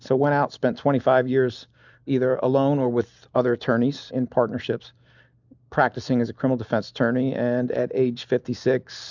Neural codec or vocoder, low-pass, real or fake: none; 7.2 kHz; real